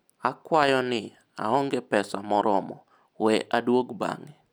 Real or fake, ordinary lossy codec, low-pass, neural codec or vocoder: real; none; none; none